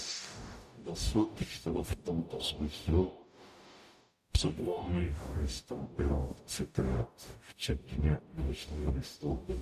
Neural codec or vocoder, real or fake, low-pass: codec, 44.1 kHz, 0.9 kbps, DAC; fake; 14.4 kHz